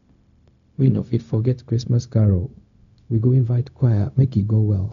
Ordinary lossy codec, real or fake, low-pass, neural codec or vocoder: none; fake; 7.2 kHz; codec, 16 kHz, 0.4 kbps, LongCat-Audio-Codec